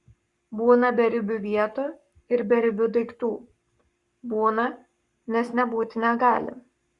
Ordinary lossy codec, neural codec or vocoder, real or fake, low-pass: Opus, 64 kbps; codec, 44.1 kHz, 7.8 kbps, Pupu-Codec; fake; 10.8 kHz